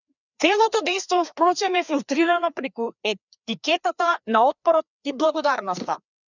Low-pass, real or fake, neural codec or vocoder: 7.2 kHz; fake; codec, 16 kHz, 2 kbps, FreqCodec, larger model